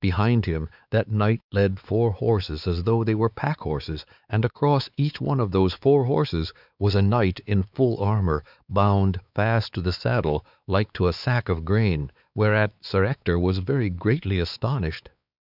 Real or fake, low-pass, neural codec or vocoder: fake; 5.4 kHz; codec, 16 kHz, 4 kbps, X-Codec, WavLM features, trained on Multilingual LibriSpeech